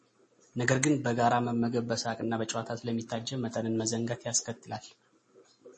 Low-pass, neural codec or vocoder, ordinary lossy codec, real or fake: 10.8 kHz; none; MP3, 32 kbps; real